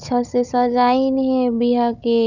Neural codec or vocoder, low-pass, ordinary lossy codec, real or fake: codec, 16 kHz, 16 kbps, FunCodec, trained on Chinese and English, 50 frames a second; 7.2 kHz; none; fake